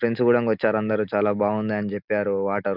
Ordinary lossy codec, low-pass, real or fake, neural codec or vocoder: none; 5.4 kHz; real; none